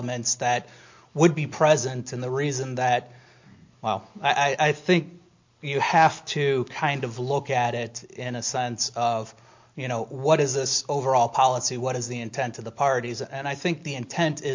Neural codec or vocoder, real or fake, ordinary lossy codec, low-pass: none; real; MP3, 48 kbps; 7.2 kHz